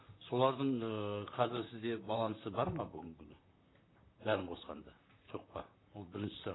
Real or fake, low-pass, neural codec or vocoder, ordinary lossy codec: fake; 7.2 kHz; vocoder, 44.1 kHz, 128 mel bands, Pupu-Vocoder; AAC, 16 kbps